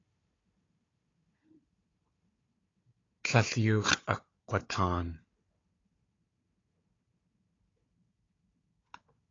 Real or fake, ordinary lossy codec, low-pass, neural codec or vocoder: fake; AAC, 32 kbps; 7.2 kHz; codec, 16 kHz, 4 kbps, FunCodec, trained on Chinese and English, 50 frames a second